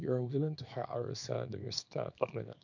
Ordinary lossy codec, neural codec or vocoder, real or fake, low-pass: none; codec, 24 kHz, 0.9 kbps, WavTokenizer, small release; fake; 7.2 kHz